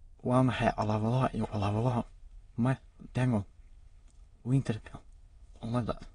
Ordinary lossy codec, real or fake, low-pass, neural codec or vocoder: AAC, 32 kbps; fake; 9.9 kHz; autoencoder, 22.05 kHz, a latent of 192 numbers a frame, VITS, trained on many speakers